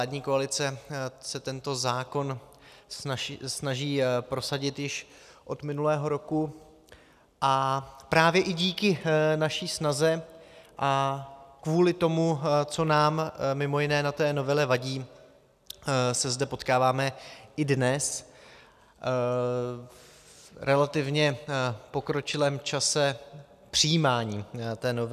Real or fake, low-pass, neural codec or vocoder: real; 14.4 kHz; none